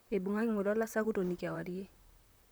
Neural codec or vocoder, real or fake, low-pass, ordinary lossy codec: vocoder, 44.1 kHz, 128 mel bands, Pupu-Vocoder; fake; none; none